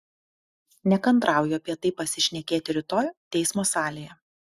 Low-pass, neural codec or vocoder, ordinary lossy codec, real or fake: 14.4 kHz; none; Opus, 64 kbps; real